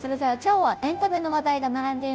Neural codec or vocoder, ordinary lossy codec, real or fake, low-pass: codec, 16 kHz, 0.5 kbps, FunCodec, trained on Chinese and English, 25 frames a second; none; fake; none